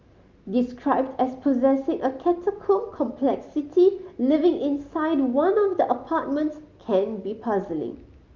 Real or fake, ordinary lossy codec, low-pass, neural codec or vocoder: real; Opus, 24 kbps; 7.2 kHz; none